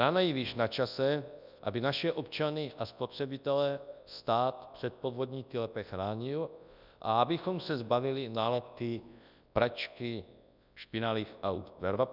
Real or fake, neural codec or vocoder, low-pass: fake; codec, 24 kHz, 0.9 kbps, WavTokenizer, large speech release; 5.4 kHz